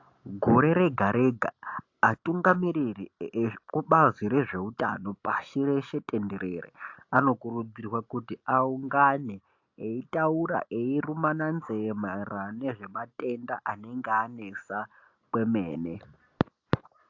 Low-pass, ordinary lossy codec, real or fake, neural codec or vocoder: 7.2 kHz; AAC, 48 kbps; real; none